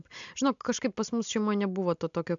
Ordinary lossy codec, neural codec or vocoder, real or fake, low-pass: AAC, 64 kbps; none; real; 7.2 kHz